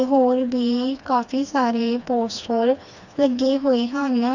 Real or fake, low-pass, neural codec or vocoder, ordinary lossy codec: fake; 7.2 kHz; codec, 16 kHz, 2 kbps, FreqCodec, smaller model; none